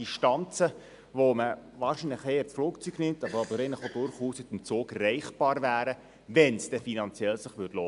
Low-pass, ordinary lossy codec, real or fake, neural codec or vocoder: 10.8 kHz; none; real; none